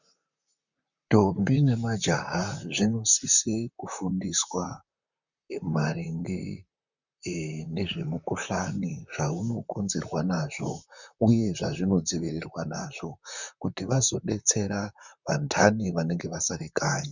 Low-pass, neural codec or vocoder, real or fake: 7.2 kHz; vocoder, 44.1 kHz, 128 mel bands, Pupu-Vocoder; fake